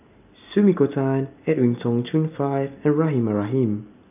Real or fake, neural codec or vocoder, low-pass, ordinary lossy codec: real; none; 3.6 kHz; AAC, 32 kbps